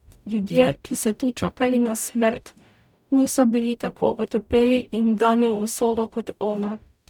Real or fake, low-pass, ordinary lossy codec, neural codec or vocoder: fake; 19.8 kHz; none; codec, 44.1 kHz, 0.9 kbps, DAC